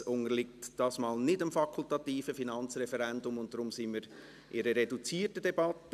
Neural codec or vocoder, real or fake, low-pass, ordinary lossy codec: none; real; 14.4 kHz; none